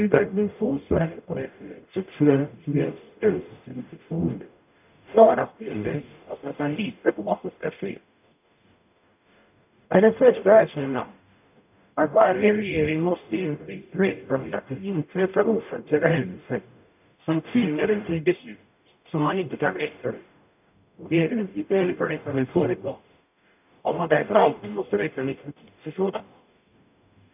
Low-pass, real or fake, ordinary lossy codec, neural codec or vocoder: 3.6 kHz; fake; none; codec, 44.1 kHz, 0.9 kbps, DAC